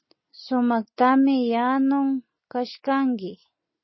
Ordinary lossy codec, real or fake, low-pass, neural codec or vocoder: MP3, 24 kbps; real; 7.2 kHz; none